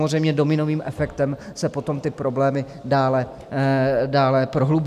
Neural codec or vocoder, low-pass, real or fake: none; 14.4 kHz; real